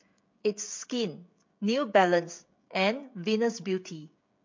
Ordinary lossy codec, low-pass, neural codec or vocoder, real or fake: MP3, 48 kbps; 7.2 kHz; codec, 16 kHz, 16 kbps, FreqCodec, smaller model; fake